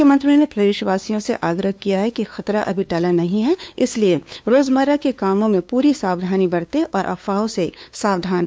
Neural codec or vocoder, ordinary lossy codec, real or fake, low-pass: codec, 16 kHz, 2 kbps, FunCodec, trained on LibriTTS, 25 frames a second; none; fake; none